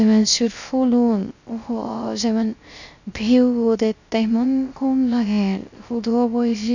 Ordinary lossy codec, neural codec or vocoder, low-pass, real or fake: none; codec, 16 kHz, 0.3 kbps, FocalCodec; 7.2 kHz; fake